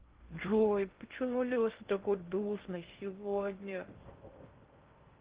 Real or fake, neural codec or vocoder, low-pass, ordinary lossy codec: fake; codec, 16 kHz in and 24 kHz out, 0.6 kbps, FocalCodec, streaming, 2048 codes; 3.6 kHz; Opus, 16 kbps